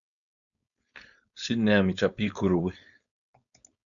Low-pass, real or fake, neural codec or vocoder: 7.2 kHz; fake; codec, 16 kHz, 4.8 kbps, FACodec